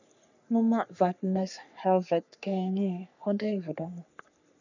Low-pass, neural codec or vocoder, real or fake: 7.2 kHz; codec, 44.1 kHz, 3.4 kbps, Pupu-Codec; fake